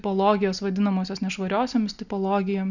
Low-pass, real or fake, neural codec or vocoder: 7.2 kHz; real; none